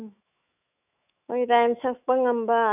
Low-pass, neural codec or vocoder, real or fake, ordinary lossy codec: 3.6 kHz; autoencoder, 48 kHz, 128 numbers a frame, DAC-VAE, trained on Japanese speech; fake; none